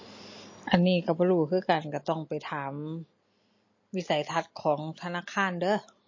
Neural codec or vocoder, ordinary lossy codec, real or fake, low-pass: none; MP3, 32 kbps; real; 7.2 kHz